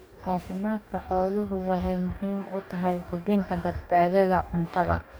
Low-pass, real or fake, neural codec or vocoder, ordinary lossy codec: none; fake; codec, 44.1 kHz, 2.6 kbps, DAC; none